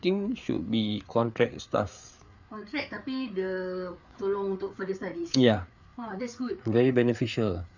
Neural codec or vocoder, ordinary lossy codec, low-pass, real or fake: codec, 16 kHz, 4 kbps, FreqCodec, larger model; none; 7.2 kHz; fake